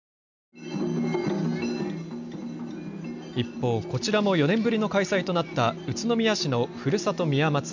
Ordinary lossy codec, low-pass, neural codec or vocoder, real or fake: none; 7.2 kHz; none; real